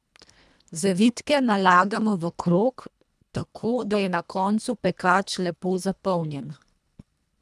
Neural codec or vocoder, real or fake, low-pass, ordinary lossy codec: codec, 24 kHz, 1.5 kbps, HILCodec; fake; none; none